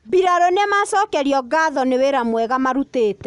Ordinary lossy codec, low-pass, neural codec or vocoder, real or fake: none; 10.8 kHz; none; real